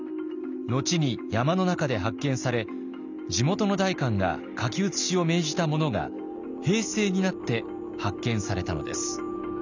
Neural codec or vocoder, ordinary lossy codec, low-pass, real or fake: none; none; 7.2 kHz; real